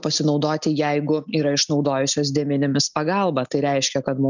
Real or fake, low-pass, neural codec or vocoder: real; 7.2 kHz; none